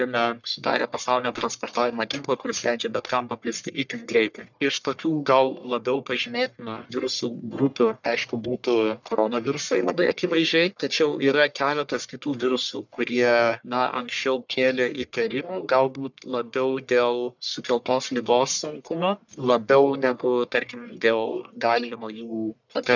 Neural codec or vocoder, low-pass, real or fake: codec, 44.1 kHz, 1.7 kbps, Pupu-Codec; 7.2 kHz; fake